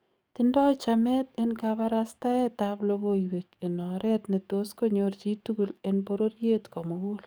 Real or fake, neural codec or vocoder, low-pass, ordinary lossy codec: fake; codec, 44.1 kHz, 7.8 kbps, DAC; none; none